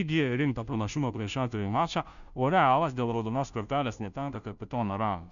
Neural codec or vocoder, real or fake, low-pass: codec, 16 kHz, 0.5 kbps, FunCodec, trained on Chinese and English, 25 frames a second; fake; 7.2 kHz